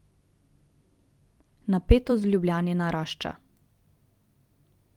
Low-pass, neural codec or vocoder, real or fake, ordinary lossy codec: 19.8 kHz; vocoder, 44.1 kHz, 128 mel bands every 256 samples, BigVGAN v2; fake; Opus, 24 kbps